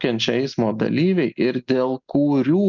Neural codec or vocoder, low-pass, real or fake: none; 7.2 kHz; real